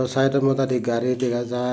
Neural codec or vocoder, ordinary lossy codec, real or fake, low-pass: none; none; real; none